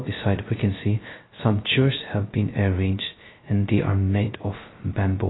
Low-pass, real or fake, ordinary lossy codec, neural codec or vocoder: 7.2 kHz; fake; AAC, 16 kbps; codec, 16 kHz, 0.2 kbps, FocalCodec